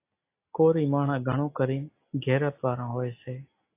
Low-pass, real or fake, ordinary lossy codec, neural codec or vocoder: 3.6 kHz; real; AAC, 24 kbps; none